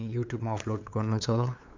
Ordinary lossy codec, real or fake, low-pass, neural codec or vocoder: MP3, 64 kbps; fake; 7.2 kHz; codec, 16 kHz, 8 kbps, FunCodec, trained on LibriTTS, 25 frames a second